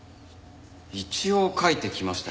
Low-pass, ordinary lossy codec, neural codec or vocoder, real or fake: none; none; none; real